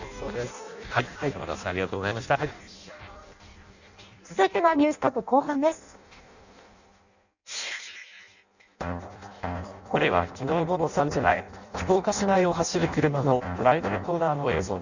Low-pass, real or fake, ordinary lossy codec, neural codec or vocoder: 7.2 kHz; fake; none; codec, 16 kHz in and 24 kHz out, 0.6 kbps, FireRedTTS-2 codec